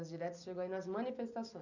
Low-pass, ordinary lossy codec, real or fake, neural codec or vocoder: 7.2 kHz; none; real; none